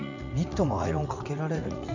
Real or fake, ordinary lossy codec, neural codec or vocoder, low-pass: fake; none; vocoder, 44.1 kHz, 80 mel bands, Vocos; 7.2 kHz